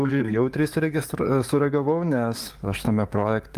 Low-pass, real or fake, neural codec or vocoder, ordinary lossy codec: 14.4 kHz; fake; codec, 44.1 kHz, 7.8 kbps, DAC; Opus, 32 kbps